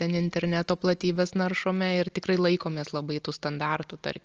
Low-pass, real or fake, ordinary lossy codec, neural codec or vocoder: 7.2 kHz; real; Opus, 24 kbps; none